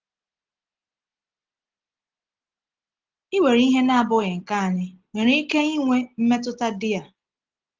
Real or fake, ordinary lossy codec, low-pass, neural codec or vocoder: real; Opus, 16 kbps; 7.2 kHz; none